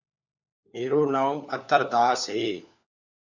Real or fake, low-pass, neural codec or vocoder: fake; 7.2 kHz; codec, 16 kHz, 4 kbps, FunCodec, trained on LibriTTS, 50 frames a second